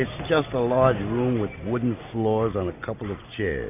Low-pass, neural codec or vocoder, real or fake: 3.6 kHz; none; real